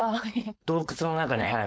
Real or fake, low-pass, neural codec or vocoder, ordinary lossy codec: fake; none; codec, 16 kHz, 4.8 kbps, FACodec; none